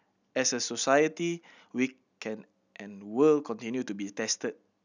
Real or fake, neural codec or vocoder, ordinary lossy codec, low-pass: real; none; none; 7.2 kHz